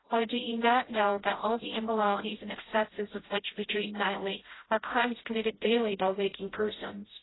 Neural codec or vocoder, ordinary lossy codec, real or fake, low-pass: codec, 16 kHz, 0.5 kbps, FreqCodec, smaller model; AAC, 16 kbps; fake; 7.2 kHz